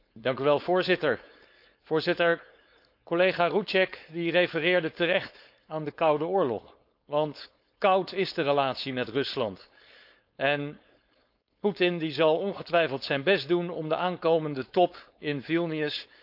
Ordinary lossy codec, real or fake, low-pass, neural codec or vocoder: none; fake; 5.4 kHz; codec, 16 kHz, 4.8 kbps, FACodec